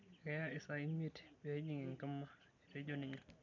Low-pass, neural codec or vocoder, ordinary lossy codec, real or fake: 7.2 kHz; none; MP3, 64 kbps; real